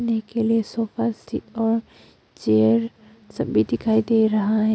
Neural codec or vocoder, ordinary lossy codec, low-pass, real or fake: none; none; none; real